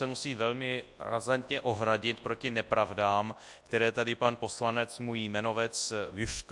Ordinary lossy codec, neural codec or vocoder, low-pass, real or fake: AAC, 64 kbps; codec, 24 kHz, 0.9 kbps, WavTokenizer, large speech release; 10.8 kHz; fake